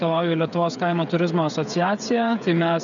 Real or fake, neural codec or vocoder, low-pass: fake; codec, 16 kHz, 8 kbps, FreqCodec, smaller model; 7.2 kHz